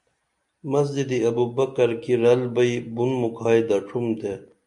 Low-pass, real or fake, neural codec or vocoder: 10.8 kHz; real; none